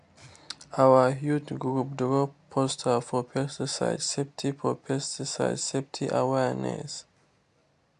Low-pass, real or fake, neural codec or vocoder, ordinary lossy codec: 10.8 kHz; real; none; AAC, 64 kbps